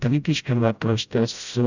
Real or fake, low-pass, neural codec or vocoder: fake; 7.2 kHz; codec, 16 kHz, 0.5 kbps, FreqCodec, smaller model